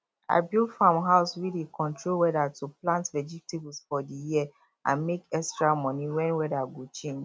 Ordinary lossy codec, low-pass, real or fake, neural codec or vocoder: none; none; real; none